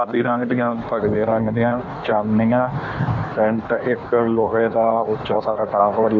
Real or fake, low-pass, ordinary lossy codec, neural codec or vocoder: fake; 7.2 kHz; none; codec, 16 kHz in and 24 kHz out, 1.1 kbps, FireRedTTS-2 codec